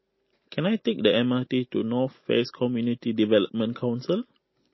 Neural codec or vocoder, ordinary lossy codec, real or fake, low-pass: none; MP3, 24 kbps; real; 7.2 kHz